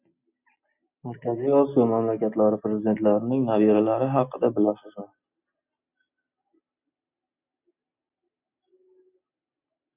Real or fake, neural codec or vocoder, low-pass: real; none; 3.6 kHz